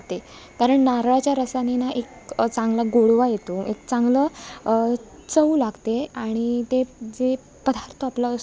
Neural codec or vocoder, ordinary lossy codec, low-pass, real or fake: none; none; none; real